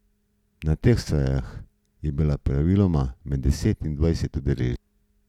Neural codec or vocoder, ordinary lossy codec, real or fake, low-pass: none; none; real; 19.8 kHz